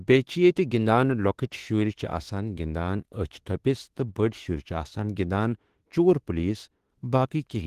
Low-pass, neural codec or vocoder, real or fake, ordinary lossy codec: 14.4 kHz; autoencoder, 48 kHz, 32 numbers a frame, DAC-VAE, trained on Japanese speech; fake; Opus, 16 kbps